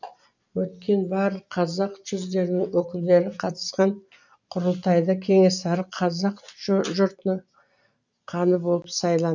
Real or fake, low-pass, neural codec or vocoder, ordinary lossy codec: real; 7.2 kHz; none; none